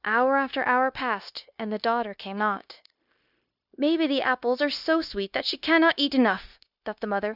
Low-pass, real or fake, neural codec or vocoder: 5.4 kHz; fake; codec, 16 kHz, 0.9 kbps, LongCat-Audio-Codec